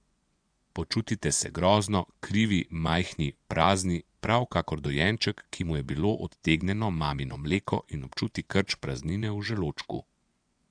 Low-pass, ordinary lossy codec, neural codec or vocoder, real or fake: 9.9 kHz; AAC, 64 kbps; vocoder, 24 kHz, 100 mel bands, Vocos; fake